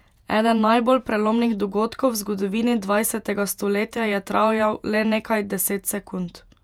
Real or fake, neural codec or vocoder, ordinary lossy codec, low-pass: fake; vocoder, 48 kHz, 128 mel bands, Vocos; none; 19.8 kHz